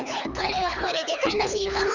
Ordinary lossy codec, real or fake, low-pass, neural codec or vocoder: none; fake; 7.2 kHz; codec, 24 kHz, 3 kbps, HILCodec